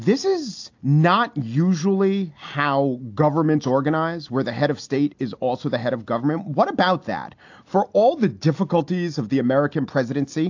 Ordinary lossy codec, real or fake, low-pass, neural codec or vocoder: AAC, 48 kbps; real; 7.2 kHz; none